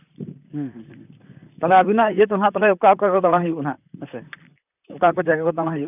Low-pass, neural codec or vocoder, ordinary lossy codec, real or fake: 3.6 kHz; vocoder, 44.1 kHz, 128 mel bands, Pupu-Vocoder; none; fake